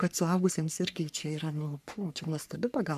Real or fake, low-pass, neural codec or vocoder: fake; 14.4 kHz; codec, 44.1 kHz, 3.4 kbps, Pupu-Codec